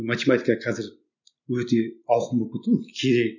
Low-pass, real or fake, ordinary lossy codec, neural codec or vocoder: 7.2 kHz; real; none; none